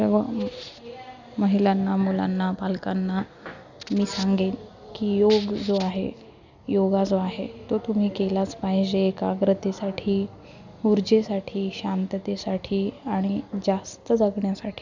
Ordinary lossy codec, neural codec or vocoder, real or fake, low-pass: none; none; real; 7.2 kHz